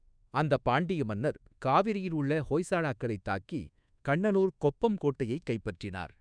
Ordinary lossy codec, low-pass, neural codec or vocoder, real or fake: none; 9.9 kHz; codec, 24 kHz, 1.2 kbps, DualCodec; fake